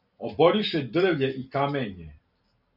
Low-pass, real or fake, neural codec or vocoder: 5.4 kHz; real; none